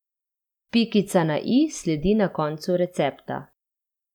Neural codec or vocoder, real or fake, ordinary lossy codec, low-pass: none; real; none; 19.8 kHz